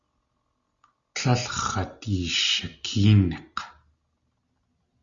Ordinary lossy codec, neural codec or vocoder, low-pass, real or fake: Opus, 64 kbps; none; 7.2 kHz; real